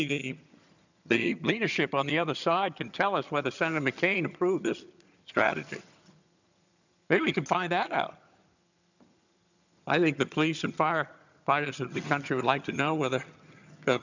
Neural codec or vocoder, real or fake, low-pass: vocoder, 22.05 kHz, 80 mel bands, HiFi-GAN; fake; 7.2 kHz